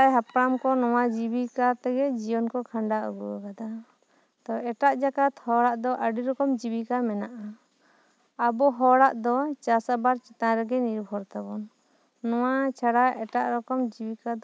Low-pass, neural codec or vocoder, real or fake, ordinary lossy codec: none; none; real; none